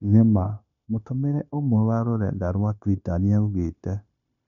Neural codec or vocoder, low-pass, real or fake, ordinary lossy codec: codec, 16 kHz, 0.9 kbps, LongCat-Audio-Codec; 7.2 kHz; fake; none